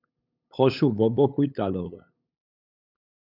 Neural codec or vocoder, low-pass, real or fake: codec, 16 kHz, 8 kbps, FunCodec, trained on LibriTTS, 25 frames a second; 5.4 kHz; fake